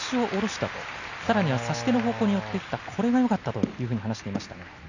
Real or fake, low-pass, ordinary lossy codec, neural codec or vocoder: real; 7.2 kHz; none; none